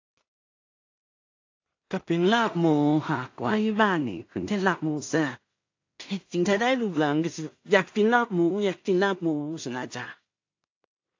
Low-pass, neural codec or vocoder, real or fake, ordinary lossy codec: 7.2 kHz; codec, 16 kHz in and 24 kHz out, 0.4 kbps, LongCat-Audio-Codec, two codebook decoder; fake; AAC, 48 kbps